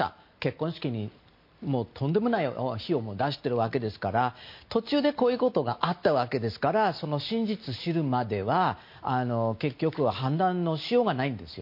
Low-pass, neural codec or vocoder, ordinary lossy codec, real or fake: 5.4 kHz; none; MP3, 32 kbps; real